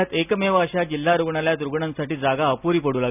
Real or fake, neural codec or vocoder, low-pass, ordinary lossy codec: real; none; 3.6 kHz; none